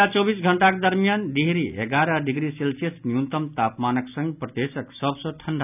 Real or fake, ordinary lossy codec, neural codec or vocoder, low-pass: real; none; none; 3.6 kHz